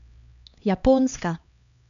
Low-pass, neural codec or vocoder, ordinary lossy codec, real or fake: 7.2 kHz; codec, 16 kHz, 2 kbps, X-Codec, HuBERT features, trained on LibriSpeech; none; fake